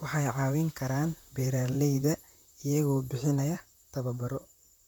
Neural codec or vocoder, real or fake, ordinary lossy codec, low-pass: vocoder, 44.1 kHz, 128 mel bands, Pupu-Vocoder; fake; none; none